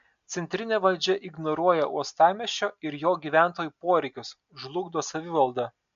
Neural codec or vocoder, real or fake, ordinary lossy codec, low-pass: none; real; MP3, 64 kbps; 7.2 kHz